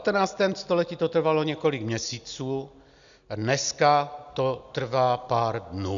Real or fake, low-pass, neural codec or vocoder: real; 7.2 kHz; none